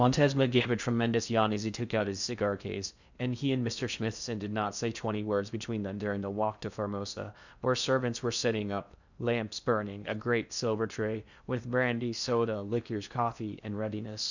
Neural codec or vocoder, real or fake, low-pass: codec, 16 kHz in and 24 kHz out, 0.6 kbps, FocalCodec, streaming, 4096 codes; fake; 7.2 kHz